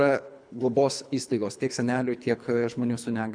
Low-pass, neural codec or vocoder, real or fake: 9.9 kHz; codec, 24 kHz, 3 kbps, HILCodec; fake